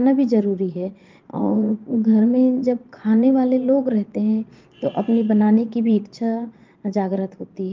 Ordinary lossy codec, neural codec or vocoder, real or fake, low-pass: Opus, 32 kbps; vocoder, 22.05 kHz, 80 mel bands, Vocos; fake; 7.2 kHz